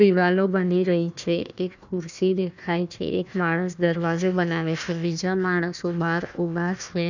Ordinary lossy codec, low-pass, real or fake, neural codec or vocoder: none; 7.2 kHz; fake; codec, 16 kHz, 1 kbps, FunCodec, trained on Chinese and English, 50 frames a second